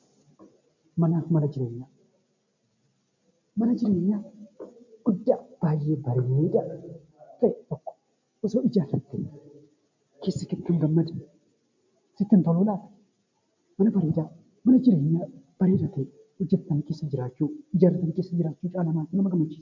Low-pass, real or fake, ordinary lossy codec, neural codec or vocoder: 7.2 kHz; real; MP3, 64 kbps; none